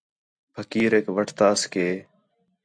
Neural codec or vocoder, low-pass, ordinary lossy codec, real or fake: none; 9.9 kHz; MP3, 96 kbps; real